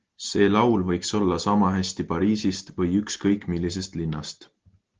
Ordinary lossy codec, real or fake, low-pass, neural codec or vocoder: Opus, 16 kbps; real; 7.2 kHz; none